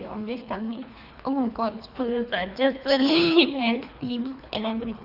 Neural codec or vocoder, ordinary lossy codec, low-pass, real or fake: codec, 24 kHz, 3 kbps, HILCodec; none; 5.4 kHz; fake